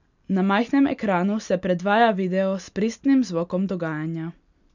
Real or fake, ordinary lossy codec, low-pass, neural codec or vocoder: real; none; 7.2 kHz; none